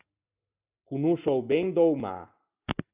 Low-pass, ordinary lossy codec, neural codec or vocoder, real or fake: 3.6 kHz; Opus, 64 kbps; none; real